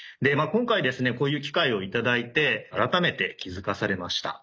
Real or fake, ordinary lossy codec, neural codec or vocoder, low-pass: real; none; none; none